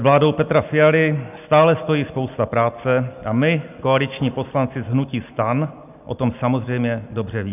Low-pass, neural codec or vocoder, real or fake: 3.6 kHz; none; real